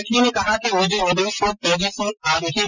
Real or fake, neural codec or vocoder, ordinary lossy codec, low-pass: real; none; none; 7.2 kHz